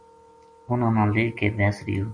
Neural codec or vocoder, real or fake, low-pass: none; real; 9.9 kHz